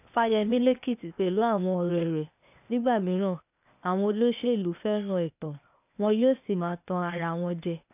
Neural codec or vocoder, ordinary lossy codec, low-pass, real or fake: codec, 16 kHz, 0.8 kbps, ZipCodec; none; 3.6 kHz; fake